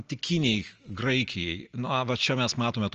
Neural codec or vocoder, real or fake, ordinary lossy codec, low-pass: none; real; Opus, 24 kbps; 7.2 kHz